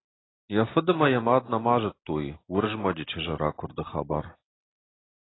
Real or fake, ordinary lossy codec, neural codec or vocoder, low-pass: real; AAC, 16 kbps; none; 7.2 kHz